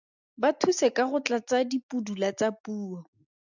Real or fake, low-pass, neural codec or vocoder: real; 7.2 kHz; none